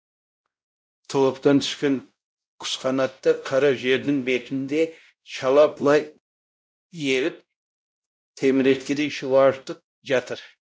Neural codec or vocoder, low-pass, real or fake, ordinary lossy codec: codec, 16 kHz, 0.5 kbps, X-Codec, WavLM features, trained on Multilingual LibriSpeech; none; fake; none